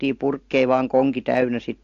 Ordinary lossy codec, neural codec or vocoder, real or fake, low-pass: AAC, 48 kbps; none; real; 7.2 kHz